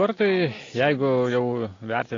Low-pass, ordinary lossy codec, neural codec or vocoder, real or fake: 7.2 kHz; AAC, 32 kbps; none; real